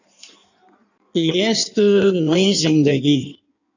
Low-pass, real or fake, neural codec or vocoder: 7.2 kHz; fake; codec, 16 kHz in and 24 kHz out, 1.1 kbps, FireRedTTS-2 codec